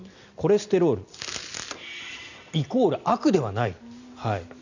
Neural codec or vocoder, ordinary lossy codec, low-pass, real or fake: none; none; 7.2 kHz; real